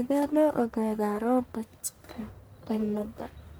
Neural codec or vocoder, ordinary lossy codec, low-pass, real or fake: codec, 44.1 kHz, 1.7 kbps, Pupu-Codec; none; none; fake